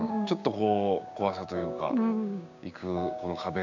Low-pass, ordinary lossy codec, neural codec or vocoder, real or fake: 7.2 kHz; none; codec, 16 kHz, 6 kbps, DAC; fake